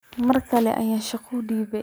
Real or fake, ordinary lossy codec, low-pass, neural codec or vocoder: real; none; none; none